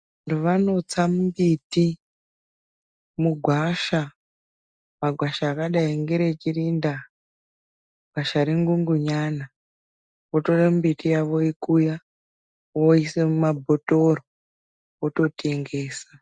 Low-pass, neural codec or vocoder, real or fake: 9.9 kHz; none; real